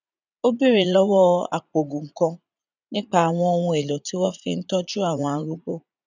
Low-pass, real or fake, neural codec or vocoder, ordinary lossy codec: 7.2 kHz; fake; vocoder, 44.1 kHz, 80 mel bands, Vocos; none